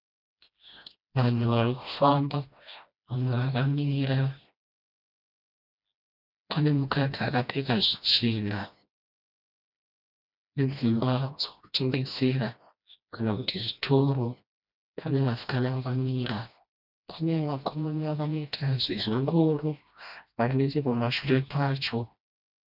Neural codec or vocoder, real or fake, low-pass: codec, 16 kHz, 1 kbps, FreqCodec, smaller model; fake; 5.4 kHz